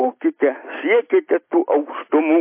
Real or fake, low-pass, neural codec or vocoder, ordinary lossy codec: real; 3.6 kHz; none; MP3, 24 kbps